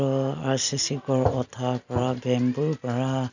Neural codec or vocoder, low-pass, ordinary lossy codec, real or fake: none; 7.2 kHz; none; real